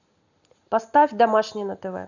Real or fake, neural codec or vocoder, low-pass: real; none; 7.2 kHz